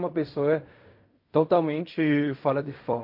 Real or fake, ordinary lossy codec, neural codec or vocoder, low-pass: fake; MP3, 32 kbps; codec, 16 kHz in and 24 kHz out, 0.4 kbps, LongCat-Audio-Codec, fine tuned four codebook decoder; 5.4 kHz